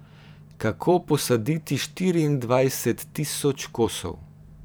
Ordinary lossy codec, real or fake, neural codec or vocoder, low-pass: none; fake; vocoder, 44.1 kHz, 128 mel bands every 512 samples, BigVGAN v2; none